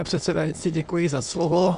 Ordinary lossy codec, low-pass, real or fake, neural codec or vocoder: Opus, 64 kbps; 9.9 kHz; fake; autoencoder, 22.05 kHz, a latent of 192 numbers a frame, VITS, trained on many speakers